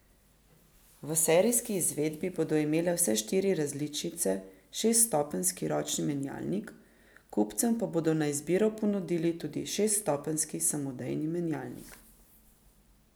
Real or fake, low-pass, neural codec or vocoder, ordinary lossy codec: real; none; none; none